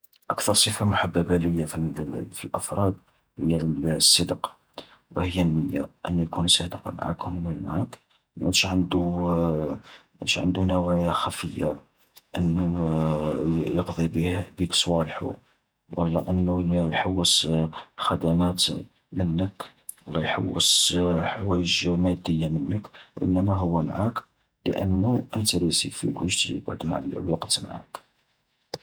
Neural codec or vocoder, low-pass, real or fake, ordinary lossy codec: vocoder, 48 kHz, 128 mel bands, Vocos; none; fake; none